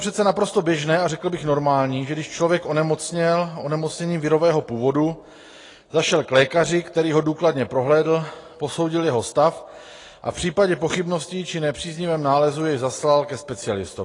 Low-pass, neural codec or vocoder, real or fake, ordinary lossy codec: 10.8 kHz; none; real; AAC, 32 kbps